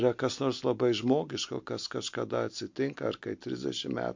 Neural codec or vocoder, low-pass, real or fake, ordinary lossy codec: none; 7.2 kHz; real; MP3, 48 kbps